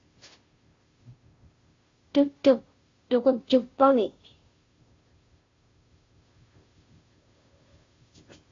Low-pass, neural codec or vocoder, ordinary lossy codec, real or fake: 7.2 kHz; codec, 16 kHz, 0.5 kbps, FunCodec, trained on Chinese and English, 25 frames a second; AAC, 32 kbps; fake